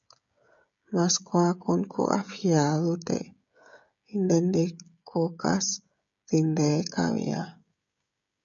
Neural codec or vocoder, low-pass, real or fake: codec, 16 kHz, 16 kbps, FreqCodec, smaller model; 7.2 kHz; fake